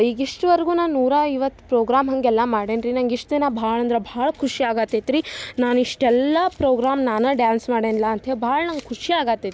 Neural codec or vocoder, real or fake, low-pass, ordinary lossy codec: none; real; none; none